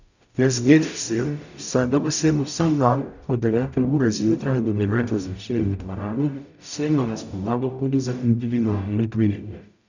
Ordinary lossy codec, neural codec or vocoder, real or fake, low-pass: none; codec, 44.1 kHz, 0.9 kbps, DAC; fake; 7.2 kHz